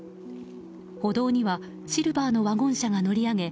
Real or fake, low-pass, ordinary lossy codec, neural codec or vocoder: real; none; none; none